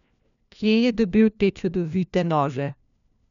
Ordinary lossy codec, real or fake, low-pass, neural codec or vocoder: none; fake; 7.2 kHz; codec, 16 kHz, 1 kbps, FunCodec, trained on LibriTTS, 50 frames a second